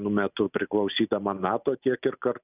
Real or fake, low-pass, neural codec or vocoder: real; 3.6 kHz; none